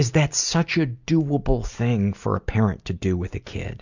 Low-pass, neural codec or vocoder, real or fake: 7.2 kHz; none; real